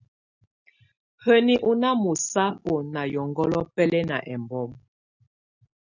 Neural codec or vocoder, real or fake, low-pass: none; real; 7.2 kHz